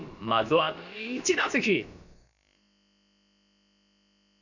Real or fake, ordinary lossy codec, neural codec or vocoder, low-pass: fake; none; codec, 16 kHz, about 1 kbps, DyCAST, with the encoder's durations; 7.2 kHz